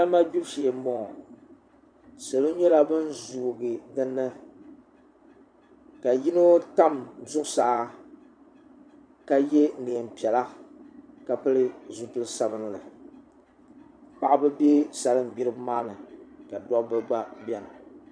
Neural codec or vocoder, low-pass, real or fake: vocoder, 22.05 kHz, 80 mel bands, Vocos; 9.9 kHz; fake